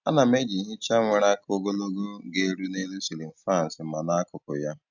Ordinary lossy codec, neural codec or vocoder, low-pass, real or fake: none; none; 7.2 kHz; real